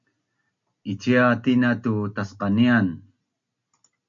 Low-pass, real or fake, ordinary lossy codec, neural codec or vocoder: 7.2 kHz; real; MP3, 64 kbps; none